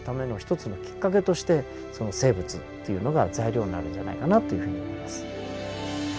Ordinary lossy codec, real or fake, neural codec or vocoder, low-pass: none; real; none; none